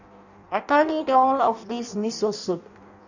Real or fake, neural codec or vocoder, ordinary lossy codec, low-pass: fake; codec, 16 kHz in and 24 kHz out, 0.6 kbps, FireRedTTS-2 codec; none; 7.2 kHz